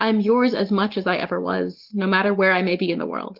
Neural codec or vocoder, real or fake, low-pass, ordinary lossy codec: none; real; 5.4 kHz; Opus, 16 kbps